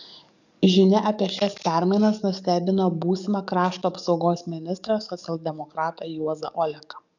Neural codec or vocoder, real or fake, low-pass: codec, 44.1 kHz, 7.8 kbps, DAC; fake; 7.2 kHz